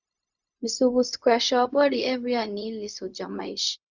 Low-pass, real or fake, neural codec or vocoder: 7.2 kHz; fake; codec, 16 kHz, 0.4 kbps, LongCat-Audio-Codec